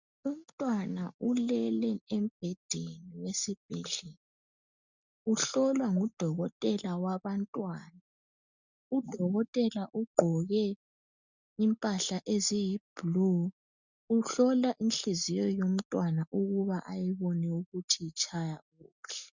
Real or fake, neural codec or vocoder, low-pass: real; none; 7.2 kHz